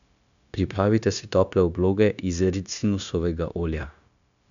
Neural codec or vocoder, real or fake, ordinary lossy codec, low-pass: codec, 16 kHz, 0.9 kbps, LongCat-Audio-Codec; fake; none; 7.2 kHz